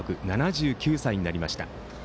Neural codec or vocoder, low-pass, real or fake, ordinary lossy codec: none; none; real; none